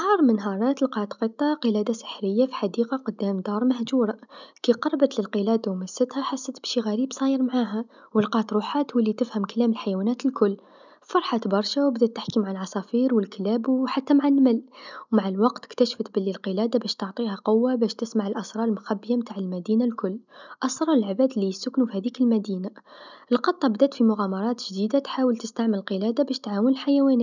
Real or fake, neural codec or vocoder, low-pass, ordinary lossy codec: real; none; 7.2 kHz; none